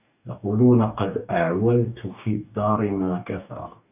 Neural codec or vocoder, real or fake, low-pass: codec, 44.1 kHz, 2.6 kbps, DAC; fake; 3.6 kHz